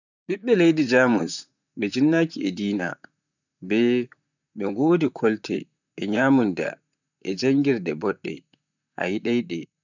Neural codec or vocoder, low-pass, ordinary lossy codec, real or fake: vocoder, 44.1 kHz, 128 mel bands, Pupu-Vocoder; 7.2 kHz; none; fake